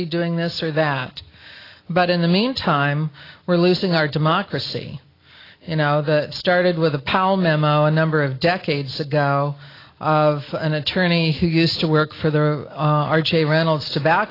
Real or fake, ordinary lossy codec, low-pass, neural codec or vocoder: real; AAC, 24 kbps; 5.4 kHz; none